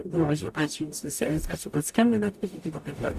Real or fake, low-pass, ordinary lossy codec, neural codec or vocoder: fake; 14.4 kHz; Opus, 64 kbps; codec, 44.1 kHz, 0.9 kbps, DAC